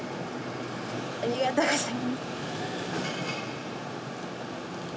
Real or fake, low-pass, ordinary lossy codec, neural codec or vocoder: real; none; none; none